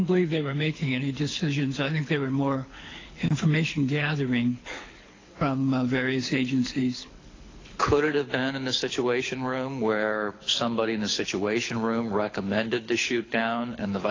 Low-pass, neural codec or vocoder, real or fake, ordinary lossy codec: 7.2 kHz; codec, 24 kHz, 6 kbps, HILCodec; fake; AAC, 32 kbps